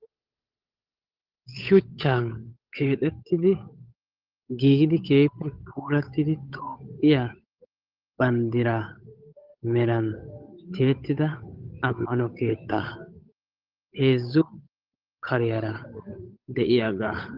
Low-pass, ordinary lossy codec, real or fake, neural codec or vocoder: 5.4 kHz; Opus, 32 kbps; fake; codec, 16 kHz, 8 kbps, FunCodec, trained on Chinese and English, 25 frames a second